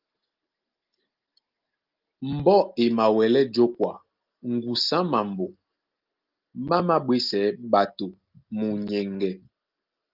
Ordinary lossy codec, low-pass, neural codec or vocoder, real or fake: Opus, 32 kbps; 5.4 kHz; none; real